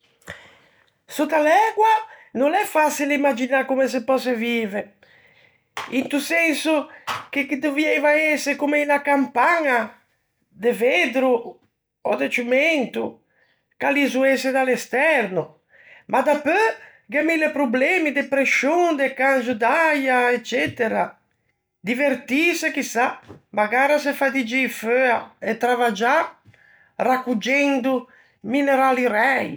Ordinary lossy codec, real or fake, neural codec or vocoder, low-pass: none; real; none; none